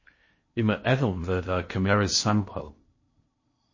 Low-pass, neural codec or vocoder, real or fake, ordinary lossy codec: 7.2 kHz; codec, 16 kHz in and 24 kHz out, 0.8 kbps, FocalCodec, streaming, 65536 codes; fake; MP3, 32 kbps